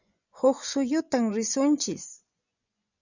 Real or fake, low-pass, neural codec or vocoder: fake; 7.2 kHz; vocoder, 24 kHz, 100 mel bands, Vocos